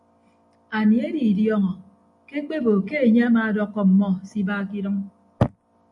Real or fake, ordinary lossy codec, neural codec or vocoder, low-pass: real; AAC, 64 kbps; none; 10.8 kHz